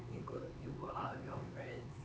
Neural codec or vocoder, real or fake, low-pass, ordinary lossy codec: codec, 16 kHz, 4 kbps, X-Codec, HuBERT features, trained on LibriSpeech; fake; none; none